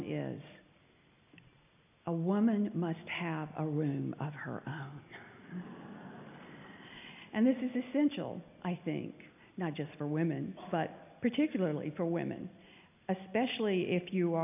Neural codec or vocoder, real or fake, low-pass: none; real; 3.6 kHz